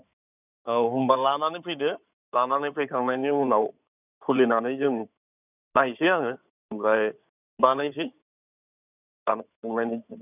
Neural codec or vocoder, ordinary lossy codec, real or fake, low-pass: codec, 16 kHz in and 24 kHz out, 2.2 kbps, FireRedTTS-2 codec; none; fake; 3.6 kHz